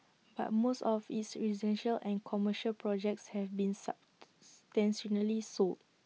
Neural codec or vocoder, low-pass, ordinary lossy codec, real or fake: none; none; none; real